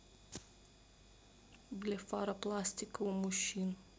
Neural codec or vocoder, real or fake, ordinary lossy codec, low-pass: none; real; none; none